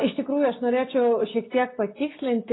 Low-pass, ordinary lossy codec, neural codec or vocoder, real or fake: 7.2 kHz; AAC, 16 kbps; none; real